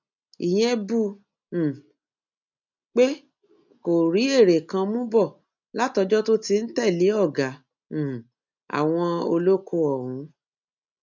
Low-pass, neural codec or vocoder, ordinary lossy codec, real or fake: 7.2 kHz; none; none; real